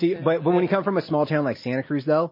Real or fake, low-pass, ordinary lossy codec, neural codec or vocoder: real; 5.4 kHz; MP3, 24 kbps; none